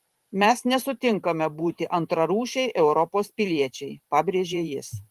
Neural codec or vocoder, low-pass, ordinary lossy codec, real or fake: vocoder, 44.1 kHz, 128 mel bands every 512 samples, BigVGAN v2; 14.4 kHz; Opus, 24 kbps; fake